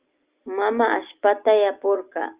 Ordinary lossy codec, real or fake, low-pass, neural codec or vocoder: Opus, 32 kbps; real; 3.6 kHz; none